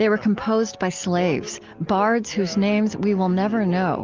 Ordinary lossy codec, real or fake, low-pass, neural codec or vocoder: Opus, 24 kbps; real; 7.2 kHz; none